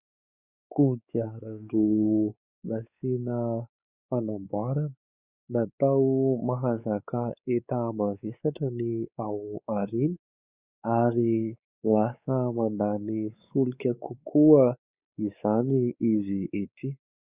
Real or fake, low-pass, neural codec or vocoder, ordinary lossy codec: fake; 3.6 kHz; codec, 16 kHz, 4 kbps, X-Codec, WavLM features, trained on Multilingual LibriSpeech; Opus, 64 kbps